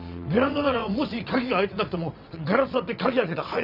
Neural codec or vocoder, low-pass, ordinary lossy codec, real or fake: vocoder, 22.05 kHz, 80 mel bands, WaveNeXt; 5.4 kHz; none; fake